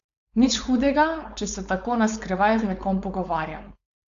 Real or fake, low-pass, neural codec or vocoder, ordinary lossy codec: fake; 7.2 kHz; codec, 16 kHz, 4.8 kbps, FACodec; Opus, 64 kbps